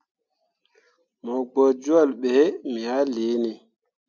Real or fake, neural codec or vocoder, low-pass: real; none; 7.2 kHz